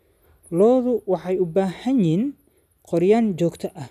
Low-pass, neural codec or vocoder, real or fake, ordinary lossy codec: 14.4 kHz; none; real; none